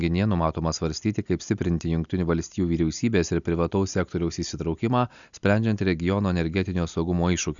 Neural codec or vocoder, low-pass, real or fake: none; 7.2 kHz; real